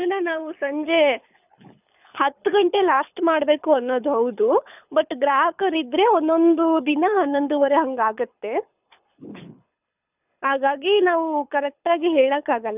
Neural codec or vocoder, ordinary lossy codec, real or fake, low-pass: codec, 24 kHz, 6 kbps, HILCodec; none; fake; 3.6 kHz